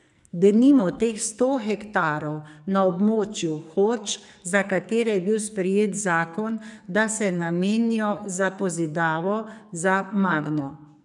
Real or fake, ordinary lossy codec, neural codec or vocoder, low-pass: fake; none; codec, 44.1 kHz, 2.6 kbps, SNAC; 10.8 kHz